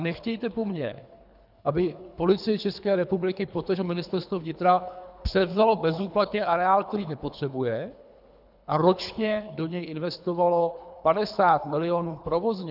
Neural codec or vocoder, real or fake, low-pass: codec, 24 kHz, 3 kbps, HILCodec; fake; 5.4 kHz